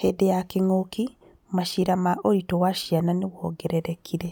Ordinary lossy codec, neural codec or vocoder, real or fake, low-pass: none; none; real; 19.8 kHz